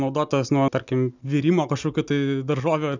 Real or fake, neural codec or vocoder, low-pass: real; none; 7.2 kHz